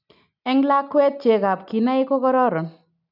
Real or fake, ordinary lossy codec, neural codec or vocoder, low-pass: real; none; none; 5.4 kHz